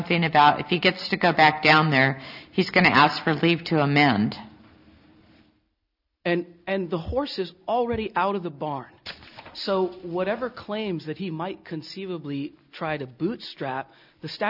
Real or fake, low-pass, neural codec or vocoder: real; 5.4 kHz; none